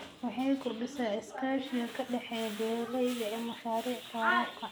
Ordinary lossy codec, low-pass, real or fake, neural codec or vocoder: none; none; real; none